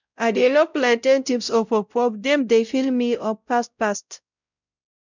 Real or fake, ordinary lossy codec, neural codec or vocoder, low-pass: fake; none; codec, 16 kHz, 0.5 kbps, X-Codec, WavLM features, trained on Multilingual LibriSpeech; 7.2 kHz